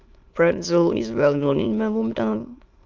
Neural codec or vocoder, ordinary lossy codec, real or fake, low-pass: autoencoder, 22.05 kHz, a latent of 192 numbers a frame, VITS, trained on many speakers; Opus, 32 kbps; fake; 7.2 kHz